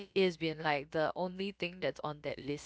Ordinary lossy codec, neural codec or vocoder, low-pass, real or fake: none; codec, 16 kHz, about 1 kbps, DyCAST, with the encoder's durations; none; fake